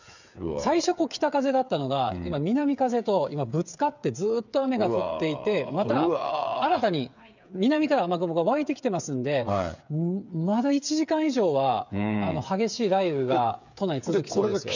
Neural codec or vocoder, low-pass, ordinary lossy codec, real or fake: codec, 16 kHz, 8 kbps, FreqCodec, smaller model; 7.2 kHz; none; fake